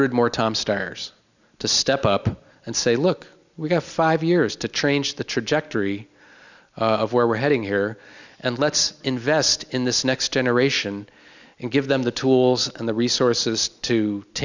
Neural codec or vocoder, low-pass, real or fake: none; 7.2 kHz; real